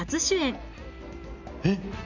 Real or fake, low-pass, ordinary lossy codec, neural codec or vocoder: real; 7.2 kHz; none; none